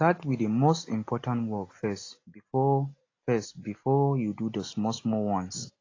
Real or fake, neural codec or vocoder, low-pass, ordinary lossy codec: real; none; 7.2 kHz; AAC, 32 kbps